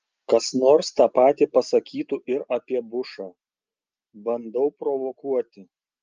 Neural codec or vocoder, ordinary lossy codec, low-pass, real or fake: none; Opus, 24 kbps; 7.2 kHz; real